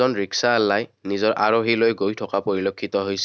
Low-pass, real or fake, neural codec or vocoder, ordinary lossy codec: none; real; none; none